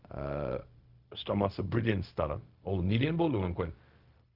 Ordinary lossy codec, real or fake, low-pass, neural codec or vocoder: Opus, 32 kbps; fake; 5.4 kHz; codec, 16 kHz, 0.4 kbps, LongCat-Audio-Codec